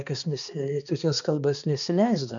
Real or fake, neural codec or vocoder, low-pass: fake; codec, 16 kHz, 2 kbps, X-Codec, HuBERT features, trained on balanced general audio; 7.2 kHz